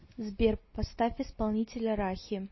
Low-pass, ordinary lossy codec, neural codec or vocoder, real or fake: 7.2 kHz; MP3, 24 kbps; none; real